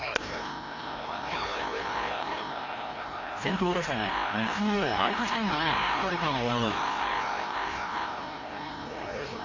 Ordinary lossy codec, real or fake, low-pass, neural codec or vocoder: AAC, 32 kbps; fake; 7.2 kHz; codec, 16 kHz, 1 kbps, FreqCodec, larger model